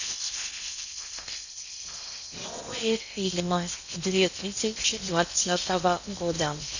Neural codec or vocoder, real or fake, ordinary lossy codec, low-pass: codec, 16 kHz in and 24 kHz out, 0.6 kbps, FocalCodec, streaming, 4096 codes; fake; none; 7.2 kHz